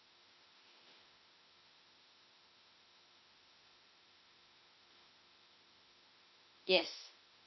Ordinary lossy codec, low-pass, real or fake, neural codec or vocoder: MP3, 24 kbps; 7.2 kHz; fake; autoencoder, 48 kHz, 32 numbers a frame, DAC-VAE, trained on Japanese speech